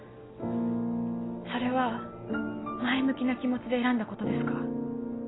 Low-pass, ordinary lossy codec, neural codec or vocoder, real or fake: 7.2 kHz; AAC, 16 kbps; none; real